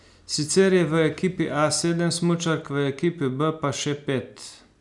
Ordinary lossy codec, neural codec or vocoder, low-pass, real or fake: none; none; 10.8 kHz; real